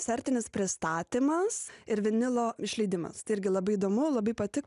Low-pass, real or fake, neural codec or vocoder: 10.8 kHz; real; none